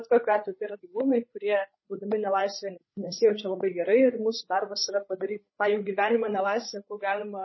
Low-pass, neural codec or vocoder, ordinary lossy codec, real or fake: 7.2 kHz; codec, 16 kHz, 8 kbps, FreqCodec, larger model; MP3, 24 kbps; fake